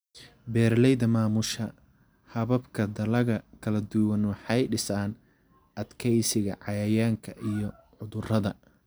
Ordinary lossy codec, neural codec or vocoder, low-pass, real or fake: none; none; none; real